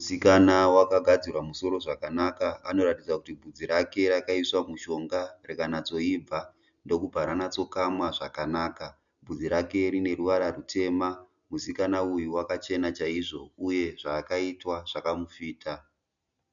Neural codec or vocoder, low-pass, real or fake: none; 7.2 kHz; real